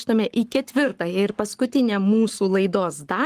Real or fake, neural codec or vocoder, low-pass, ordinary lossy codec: fake; codec, 44.1 kHz, 7.8 kbps, Pupu-Codec; 14.4 kHz; Opus, 24 kbps